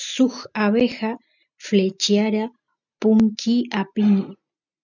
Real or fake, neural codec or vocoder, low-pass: real; none; 7.2 kHz